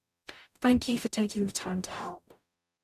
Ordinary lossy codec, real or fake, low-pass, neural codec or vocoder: none; fake; 14.4 kHz; codec, 44.1 kHz, 0.9 kbps, DAC